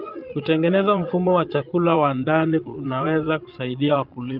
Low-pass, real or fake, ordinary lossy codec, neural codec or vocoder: 5.4 kHz; fake; Opus, 24 kbps; vocoder, 22.05 kHz, 80 mel bands, Vocos